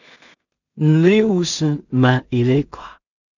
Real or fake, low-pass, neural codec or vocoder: fake; 7.2 kHz; codec, 16 kHz in and 24 kHz out, 0.4 kbps, LongCat-Audio-Codec, two codebook decoder